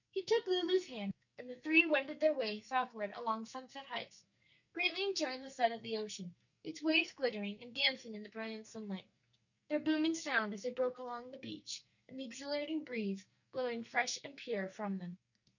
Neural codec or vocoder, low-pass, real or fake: codec, 44.1 kHz, 2.6 kbps, SNAC; 7.2 kHz; fake